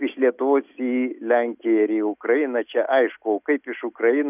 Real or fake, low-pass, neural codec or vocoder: real; 3.6 kHz; none